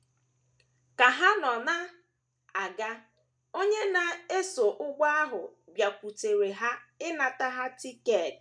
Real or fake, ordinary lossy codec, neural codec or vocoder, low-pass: real; none; none; 9.9 kHz